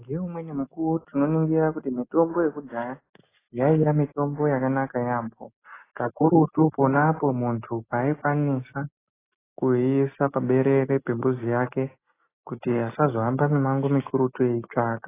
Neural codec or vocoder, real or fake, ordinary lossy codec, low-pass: none; real; AAC, 16 kbps; 3.6 kHz